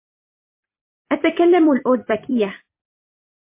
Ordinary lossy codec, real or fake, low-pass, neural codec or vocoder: MP3, 24 kbps; fake; 3.6 kHz; vocoder, 44.1 kHz, 128 mel bands every 256 samples, BigVGAN v2